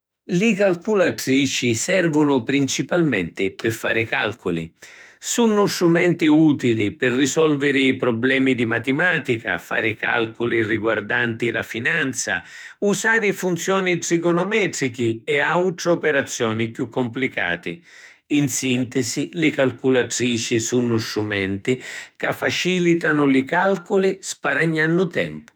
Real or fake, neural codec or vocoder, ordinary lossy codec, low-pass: fake; autoencoder, 48 kHz, 32 numbers a frame, DAC-VAE, trained on Japanese speech; none; none